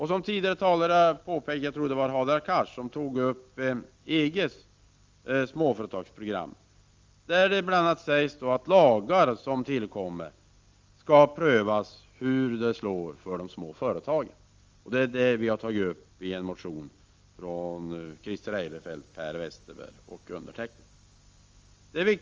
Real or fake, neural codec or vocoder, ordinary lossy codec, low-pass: real; none; Opus, 32 kbps; 7.2 kHz